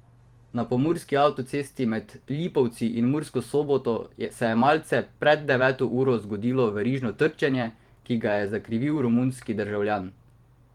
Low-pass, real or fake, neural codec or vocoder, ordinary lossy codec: 19.8 kHz; fake; vocoder, 44.1 kHz, 128 mel bands every 512 samples, BigVGAN v2; Opus, 24 kbps